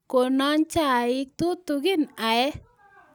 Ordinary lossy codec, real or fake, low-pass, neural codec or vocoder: none; real; none; none